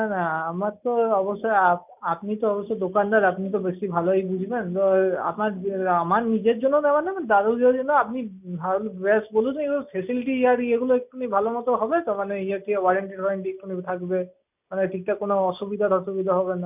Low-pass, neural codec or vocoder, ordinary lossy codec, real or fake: 3.6 kHz; none; none; real